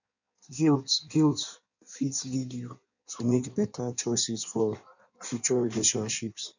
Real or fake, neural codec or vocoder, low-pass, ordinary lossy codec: fake; codec, 16 kHz in and 24 kHz out, 1.1 kbps, FireRedTTS-2 codec; 7.2 kHz; none